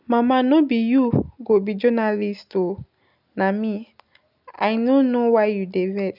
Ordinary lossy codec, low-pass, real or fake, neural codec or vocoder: none; 5.4 kHz; real; none